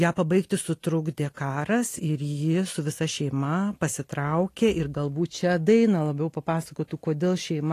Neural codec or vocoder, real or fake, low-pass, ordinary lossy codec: none; real; 14.4 kHz; AAC, 48 kbps